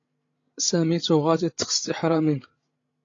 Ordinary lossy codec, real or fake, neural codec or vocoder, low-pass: AAC, 32 kbps; fake; codec, 16 kHz, 16 kbps, FreqCodec, larger model; 7.2 kHz